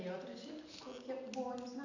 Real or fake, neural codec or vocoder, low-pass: real; none; 7.2 kHz